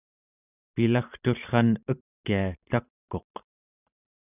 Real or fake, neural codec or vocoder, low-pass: fake; vocoder, 44.1 kHz, 128 mel bands every 512 samples, BigVGAN v2; 3.6 kHz